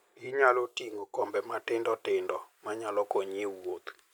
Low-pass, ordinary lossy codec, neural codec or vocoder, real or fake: none; none; none; real